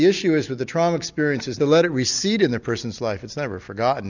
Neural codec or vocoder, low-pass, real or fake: none; 7.2 kHz; real